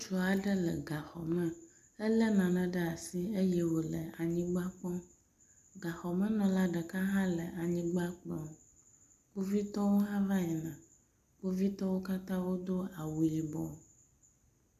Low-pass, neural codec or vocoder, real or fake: 14.4 kHz; none; real